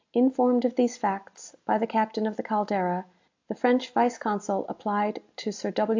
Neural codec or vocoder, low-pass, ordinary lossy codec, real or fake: none; 7.2 kHz; AAC, 48 kbps; real